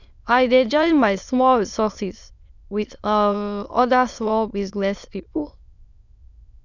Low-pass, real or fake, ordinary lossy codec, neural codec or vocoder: 7.2 kHz; fake; none; autoencoder, 22.05 kHz, a latent of 192 numbers a frame, VITS, trained on many speakers